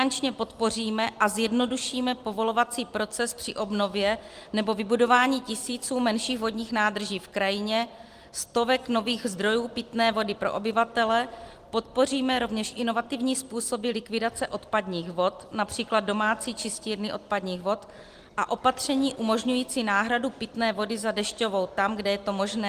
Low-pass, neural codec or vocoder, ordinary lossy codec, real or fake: 14.4 kHz; none; Opus, 24 kbps; real